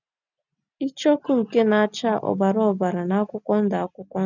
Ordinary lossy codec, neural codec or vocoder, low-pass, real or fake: none; none; 7.2 kHz; real